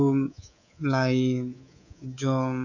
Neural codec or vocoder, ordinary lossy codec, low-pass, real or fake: codec, 24 kHz, 3.1 kbps, DualCodec; none; 7.2 kHz; fake